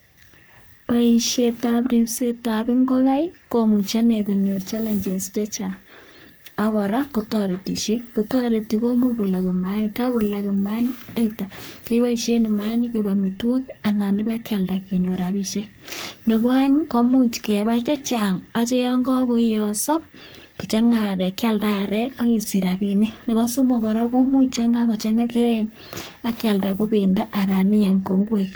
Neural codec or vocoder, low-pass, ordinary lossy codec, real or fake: codec, 44.1 kHz, 3.4 kbps, Pupu-Codec; none; none; fake